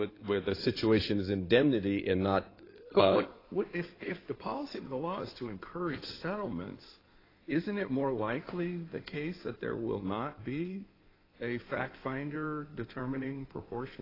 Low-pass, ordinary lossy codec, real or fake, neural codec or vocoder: 5.4 kHz; AAC, 24 kbps; fake; codec, 16 kHz in and 24 kHz out, 2.2 kbps, FireRedTTS-2 codec